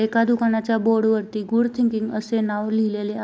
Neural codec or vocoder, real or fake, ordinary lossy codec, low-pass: none; real; none; none